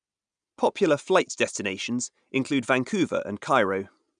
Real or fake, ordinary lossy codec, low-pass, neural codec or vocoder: real; none; 9.9 kHz; none